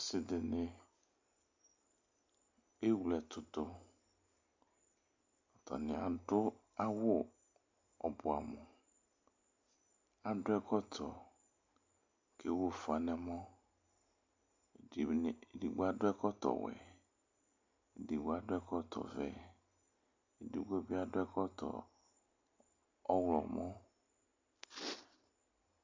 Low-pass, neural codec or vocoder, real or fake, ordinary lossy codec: 7.2 kHz; none; real; MP3, 64 kbps